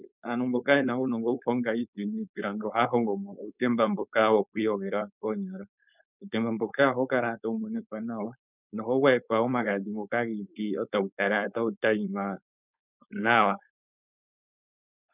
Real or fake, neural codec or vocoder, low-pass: fake; codec, 16 kHz, 4.8 kbps, FACodec; 3.6 kHz